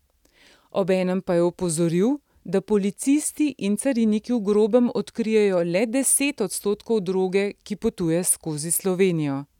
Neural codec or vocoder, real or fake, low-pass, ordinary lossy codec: none; real; 19.8 kHz; none